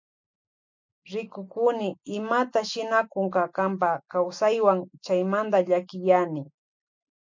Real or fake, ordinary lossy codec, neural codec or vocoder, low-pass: real; MP3, 64 kbps; none; 7.2 kHz